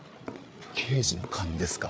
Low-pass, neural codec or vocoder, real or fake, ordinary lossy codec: none; codec, 16 kHz, 8 kbps, FreqCodec, larger model; fake; none